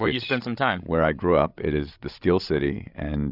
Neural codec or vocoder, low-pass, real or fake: codec, 16 kHz, 16 kbps, FreqCodec, larger model; 5.4 kHz; fake